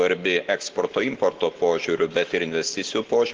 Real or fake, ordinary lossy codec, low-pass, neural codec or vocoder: real; Opus, 16 kbps; 7.2 kHz; none